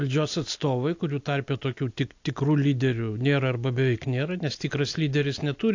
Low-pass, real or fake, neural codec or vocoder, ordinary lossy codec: 7.2 kHz; real; none; AAC, 48 kbps